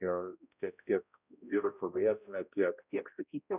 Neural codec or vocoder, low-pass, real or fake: codec, 16 kHz, 0.5 kbps, X-Codec, HuBERT features, trained on balanced general audio; 3.6 kHz; fake